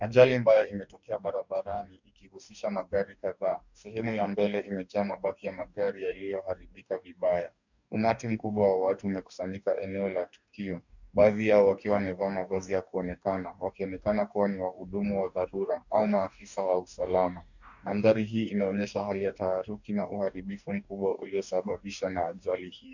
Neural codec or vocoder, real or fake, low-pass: codec, 44.1 kHz, 2.6 kbps, DAC; fake; 7.2 kHz